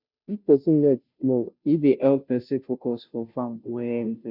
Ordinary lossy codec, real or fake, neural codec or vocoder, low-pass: none; fake; codec, 16 kHz, 0.5 kbps, FunCodec, trained on Chinese and English, 25 frames a second; 5.4 kHz